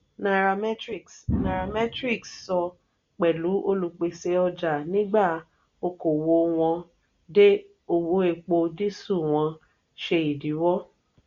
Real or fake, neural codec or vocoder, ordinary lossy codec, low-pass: real; none; MP3, 48 kbps; 7.2 kHz